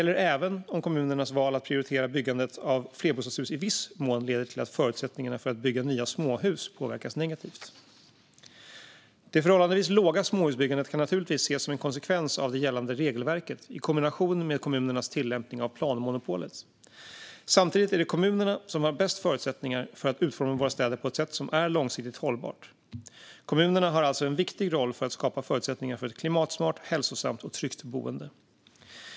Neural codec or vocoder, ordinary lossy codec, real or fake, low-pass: none; none; real; none